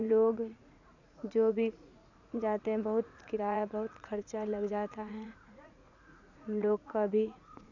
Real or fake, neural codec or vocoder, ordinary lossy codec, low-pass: fake; vocoder, 44.1 kHz, 80 mel bands, Vocos; none; 7.2 kHz